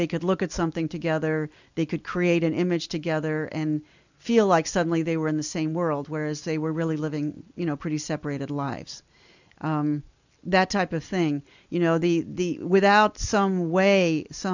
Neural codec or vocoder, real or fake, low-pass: none; real; 7.2 kHz